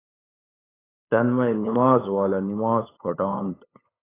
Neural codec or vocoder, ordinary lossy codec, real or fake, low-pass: codec, 16 kHz, 8 kbps, FunCodec, trained on LibriTTS, 25 frames a second; AAC, 16 kbps; fake; 3.6 kHz